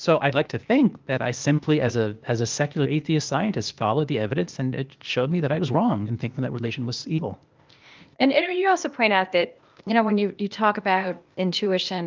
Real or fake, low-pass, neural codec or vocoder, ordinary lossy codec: fake; 7.2 kHz; codec, 16 kHz, 0.8 kbps, ZipCodec; Opus, 24 kbps